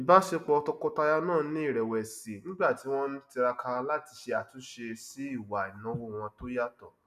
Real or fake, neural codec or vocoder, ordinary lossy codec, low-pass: real; none; none; 14.4 kHz